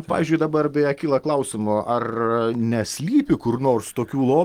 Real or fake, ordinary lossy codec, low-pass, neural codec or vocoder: real; Opus, 24 kbps; 19.8 kHz; none